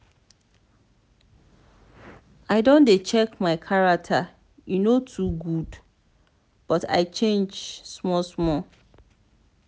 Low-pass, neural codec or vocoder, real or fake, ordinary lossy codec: none; none; real; none